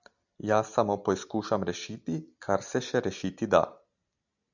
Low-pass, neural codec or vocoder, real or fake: 7.2 kHz; none; real